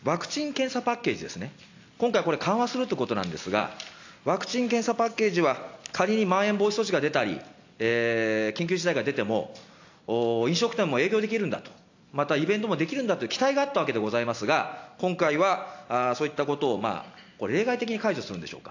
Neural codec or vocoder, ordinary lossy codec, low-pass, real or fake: none; AAC, 48 kbps; 7.2 kHz; real